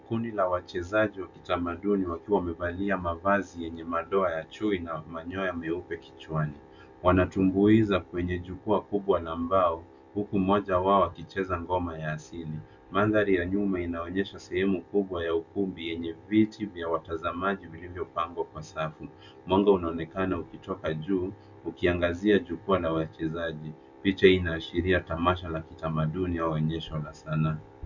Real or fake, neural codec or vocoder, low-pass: real; none; 7.2 kHz